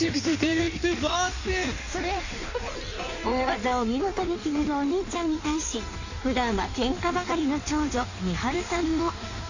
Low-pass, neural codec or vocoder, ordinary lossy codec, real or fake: 7.2 kHz; codec, 16 kHz in and 24 kHz out, 1.1 kbps, FireRedTTS-2 codec; none; fake